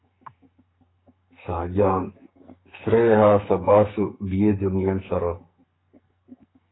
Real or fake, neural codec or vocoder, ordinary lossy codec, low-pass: fake; codec, 44.1 kHz, 2.6 kbps, SNAC; AAC, 16 kbps; 7.2 kHz